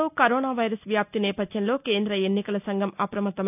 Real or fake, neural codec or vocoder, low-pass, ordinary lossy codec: real; none; 3.6 kHz; none